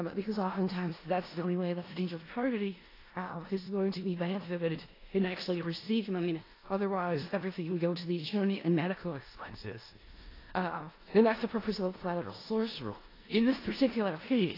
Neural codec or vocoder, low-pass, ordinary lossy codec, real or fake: codec, 16 kHz in and 24 kHz out, 0.4 kbps, LongCat-Audio-Codec, four codebook decoder; 5.4 kHz; AAC, 24 kbps; fake